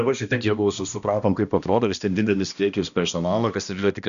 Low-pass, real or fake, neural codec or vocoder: 7.2 kHz; fake; codec, 16 kHz, 1 kbps, X-Codec, HuBERT features, trained on balanced general audio